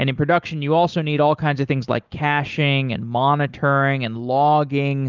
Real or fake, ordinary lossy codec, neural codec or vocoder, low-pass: real; Opus, 24 kbps; none; 7.2 kHz